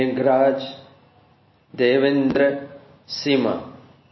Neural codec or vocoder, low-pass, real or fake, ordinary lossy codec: none; 7.2 kHz; real; MP3, 24 kbps